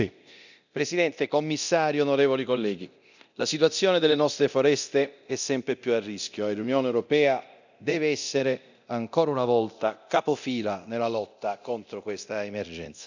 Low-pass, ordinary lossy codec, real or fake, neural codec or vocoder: 7.2 kHz; none; fake; codec, 24 kHz, 0.9 kbps, DualCodec